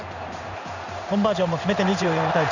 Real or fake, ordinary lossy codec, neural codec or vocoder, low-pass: fake; none; codec, 16 kHz in and 24 kHz out, 1 kbps, XY-Tokenizer; 7.2 kHz